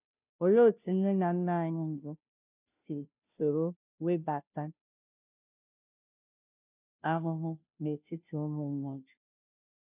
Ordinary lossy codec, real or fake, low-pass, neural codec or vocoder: none; fake; 3.6 kHz; codec, 16 kHz, 0.5 kbps, FunCodec, trained on Chinese and English, 25 frames a second